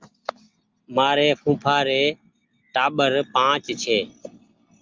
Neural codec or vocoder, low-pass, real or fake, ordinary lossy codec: none; 7.2 kHz; real; Opus, 32 kbps